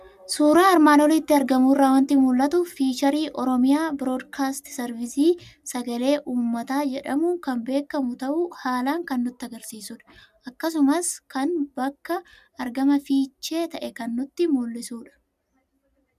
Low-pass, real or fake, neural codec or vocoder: 14.4 kHz; real; none